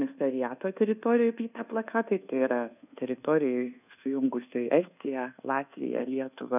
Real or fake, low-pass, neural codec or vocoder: fake; 3.6 kHz; codec, 24 kHz, 1.2 kbps, DualCodec